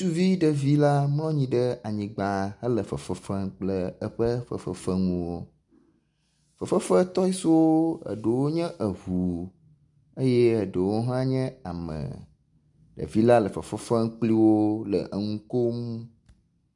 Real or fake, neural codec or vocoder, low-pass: real; none; 10.8 kHz